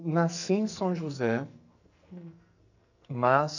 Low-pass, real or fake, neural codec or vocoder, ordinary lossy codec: 7.2 kHz; fake; codec, 44.1 kHz, 2.6 kbps, SNAC; none